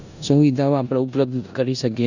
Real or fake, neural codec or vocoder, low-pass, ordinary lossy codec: fake; codec, 16 kHz in and 24 kHz out, 0.9 kbps, LongCat-Audio-Codec, four codebook decoder; 7.2 kHz; none